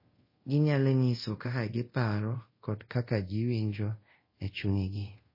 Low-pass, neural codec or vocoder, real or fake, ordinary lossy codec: 5.4 kHz; codec, 24 kHz, 0.5 kbps, DualCodec; fake; MP3, 24 kbps